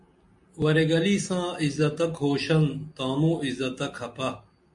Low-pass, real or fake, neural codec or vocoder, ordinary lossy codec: 10.8 kHz; real; none; MP3, 48 kbps